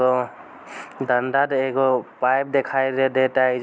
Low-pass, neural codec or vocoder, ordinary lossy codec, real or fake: none; none; none; real